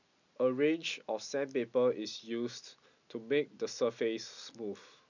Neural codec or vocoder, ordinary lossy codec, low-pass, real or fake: none; none; 7.2 kHz; real